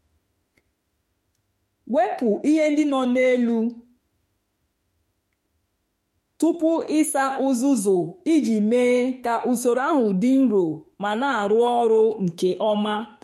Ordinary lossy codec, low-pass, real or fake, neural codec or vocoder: MP3, 64 kbps; 19.8 kHz; fake; autoencoder, 48 kHz, 32 numbers a frame, DAC-VAE, trained on Japanese speech